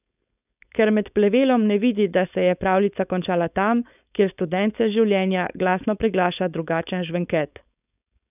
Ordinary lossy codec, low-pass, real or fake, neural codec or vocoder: none; 3.6 kHz; fake; codec, 16 kHz, 4.8 kbps, FACodec